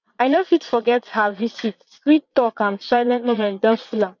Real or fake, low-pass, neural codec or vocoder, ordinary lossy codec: fake; 7.2 kHz; vocoder, 22.05 kHz, 80 mel bands, Vocos; none